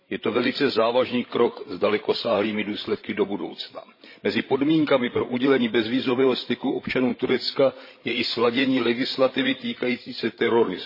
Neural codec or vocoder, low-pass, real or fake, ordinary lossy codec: codec, 16 kHz, 16 kbps, FreqCodec, larger model; 5.4 kHz; fake; MP3, 24 kbps